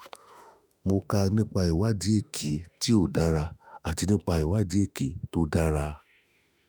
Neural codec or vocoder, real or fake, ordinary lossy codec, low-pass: autoencoder, 48 kHz, 32 numbers a frame, DAC-VAE, trained on Japanese speech; fake; none; none